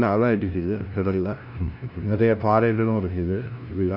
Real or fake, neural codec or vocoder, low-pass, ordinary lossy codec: fake; codec, 16 kHz, 0.5 kbps, FunCodec, trained on LibriTTS, 25 frames a second; 5.4 kHz; Opus, 64 kbps